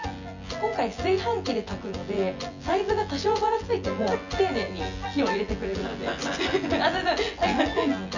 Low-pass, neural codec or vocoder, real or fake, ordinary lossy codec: 7.2 kHz; vocoder, 24 kHz, 100 mel bands, Vocos; fake; none